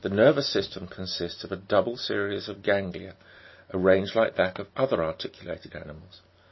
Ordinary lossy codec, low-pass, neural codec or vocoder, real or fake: MP3, 24 kbps; 7.2 kHz; none; real